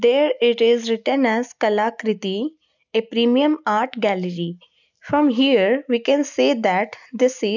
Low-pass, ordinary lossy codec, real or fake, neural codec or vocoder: 7.2 kHz; none; real; none